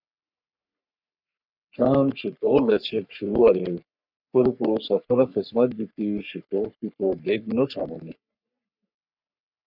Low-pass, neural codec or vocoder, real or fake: 5.4 kHz; codec, 44.1 kHz, 3.4 kbps, Pupu-Codec; fake